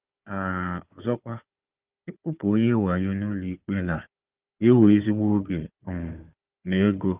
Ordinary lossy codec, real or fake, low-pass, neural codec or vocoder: Opus, 24 kbps; fake; 3.6 kHz; codec, 16 kHz, 4 kbps, FunCodec, trained on Chinese and English, 50 frames a second